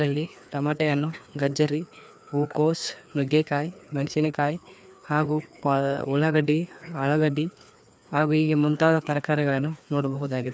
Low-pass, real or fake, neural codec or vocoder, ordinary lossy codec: none; fake; codec, 16 kHz, 2 kbps, FreqCodec, larger model; none